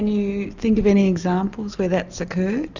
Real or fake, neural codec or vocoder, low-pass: real; none; 7.2 kHz